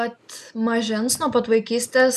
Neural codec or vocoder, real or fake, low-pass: none; real; 14.4 kHz